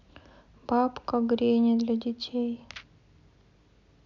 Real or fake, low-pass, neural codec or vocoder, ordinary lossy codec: real; 7.2 kHz; none; none